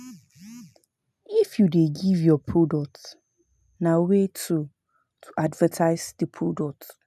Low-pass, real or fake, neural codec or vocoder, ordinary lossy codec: 14.4 kHz; real; none; none